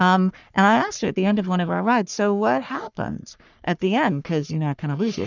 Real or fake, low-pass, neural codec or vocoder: fake; 7.2 kHz; codec, 44.1 kHz, 3.4 kbps, Pupu-Codec